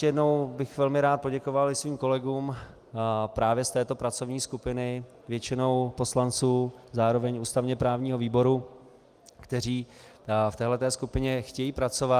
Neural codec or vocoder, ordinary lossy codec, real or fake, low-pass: none; Opus, 32 kbps; real; 14.4 kHz